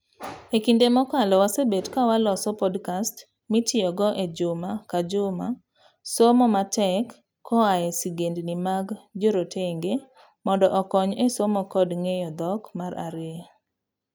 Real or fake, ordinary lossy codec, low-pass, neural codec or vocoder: real; none; none; none